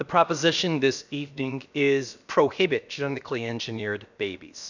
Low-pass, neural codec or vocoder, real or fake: 7.2 kHz; codec, 16 kHz, about 1 kbps, DyCAST, with the encoder's durations; fake